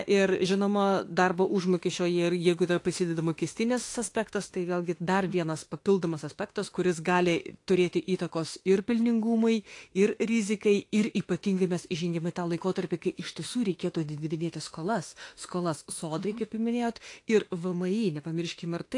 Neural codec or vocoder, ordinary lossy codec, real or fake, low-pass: autoencoder, 48 kHz, 32 numbers a frame, DAC-VAE, trained on Japanese speech; AAC, 48 kbps; fake; 10.8 kHz